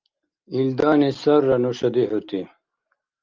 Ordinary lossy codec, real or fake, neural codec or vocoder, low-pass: Opus, 32 kbps; real; none; 7.2 kHz